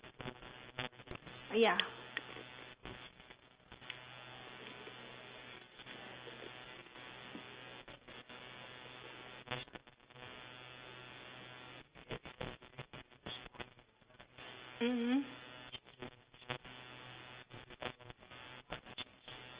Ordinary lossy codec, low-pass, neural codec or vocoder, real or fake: Opus, 24 kbps; 3.6 kHz; none; real